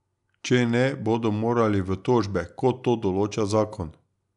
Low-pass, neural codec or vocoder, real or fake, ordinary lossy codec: 10.8 kHz; none; real; none